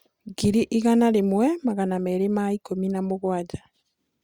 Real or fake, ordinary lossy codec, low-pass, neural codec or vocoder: real; Opus, 64 kbps; 19.8 kHz; none